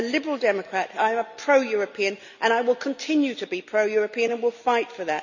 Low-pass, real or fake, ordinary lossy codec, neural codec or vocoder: 7.2 kHz; real; none; none